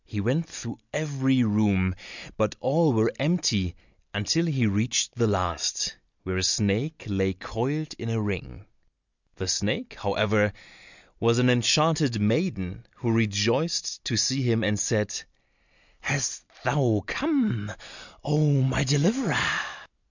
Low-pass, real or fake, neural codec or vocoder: 7.2 kHz; real; none